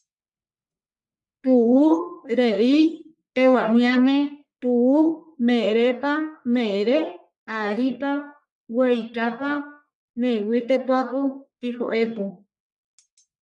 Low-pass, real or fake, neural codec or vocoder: 10.8 kHz; fake; codec, 44.1 kHz, 1.7 kbps, Pupu-Codec